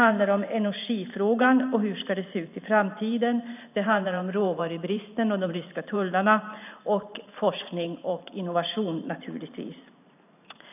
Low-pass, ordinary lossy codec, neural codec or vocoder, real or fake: 3.6 kHz; none; vocoder, 44.1 kHz, 128 mel bands every 512 samples, BigVGAN v2; fake